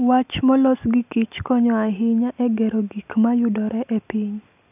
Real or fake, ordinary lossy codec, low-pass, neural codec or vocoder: real; none; 3.6 kHz; none